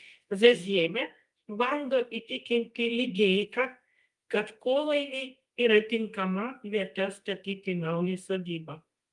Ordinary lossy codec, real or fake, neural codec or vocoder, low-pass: Opus, 32 kbps; fake; codec, 24 kHz, 0.9 kbps, WavTokenizer, medium music audio release; 10.8 kHz